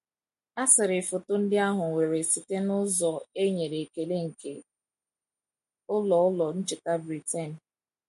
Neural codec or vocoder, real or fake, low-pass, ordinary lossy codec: none; real; 14.4 kHz; MP3, 48 kbps